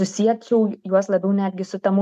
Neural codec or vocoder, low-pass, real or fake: none; 14.4 kHz; real